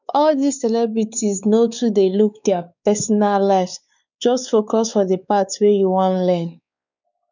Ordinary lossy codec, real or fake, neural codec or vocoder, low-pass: none; fake; codec, 16 kHz, 4 kbps, X-Codec, WavLM features, trained on Multilingual LibriSpeech; 7.2 kHz